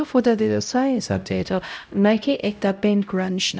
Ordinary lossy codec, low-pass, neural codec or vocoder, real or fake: none; none; codec, 16 kHz, 0.5 kbps, X-Codec, HuBERT features, trained on LibriSpeech; fake